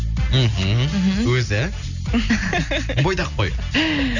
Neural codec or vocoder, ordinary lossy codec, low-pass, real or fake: none; none; 7.2 kHz; real